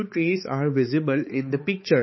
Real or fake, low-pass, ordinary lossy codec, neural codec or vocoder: fake; 7.2 kHz; MP3, 24 kbps; codec, 16 kHz, 4 kbps, X-Codec, HuBERT features, trained on balanced general audio